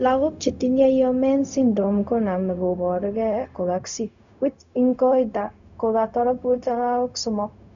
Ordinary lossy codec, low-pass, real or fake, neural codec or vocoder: none; 7.2 kHz; fake; codec, 16 kHz, 0.4 kbps, LongCat-Audio-Codec